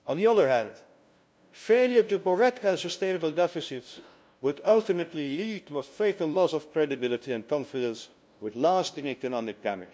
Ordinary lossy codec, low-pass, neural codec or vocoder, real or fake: none; none; codec, 16 kHz, 0.5 kbps, FunCodec, trained on LibriTTS, 25 frames a second; fake